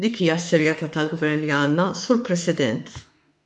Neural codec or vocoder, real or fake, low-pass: autoencoder, 48 kHz, 32 numbers a frame, DAC-VAE, trained on Japanese speech; fake; 10.8 kHz